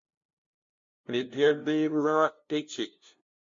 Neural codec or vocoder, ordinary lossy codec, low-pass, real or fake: codec, 16 kHz, 0.5 kbps, FunCodec, trained on LibriTTS, 25 frames a second; MP3, 48 kbps; 7.2 kHz; fake